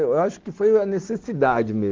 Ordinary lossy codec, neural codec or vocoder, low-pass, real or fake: Opus, 16 kbps; none; 7.2 kHz; real